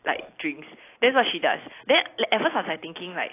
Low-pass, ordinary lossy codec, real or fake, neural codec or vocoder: 3.6 kHz; AAC, 24 kbps; real; none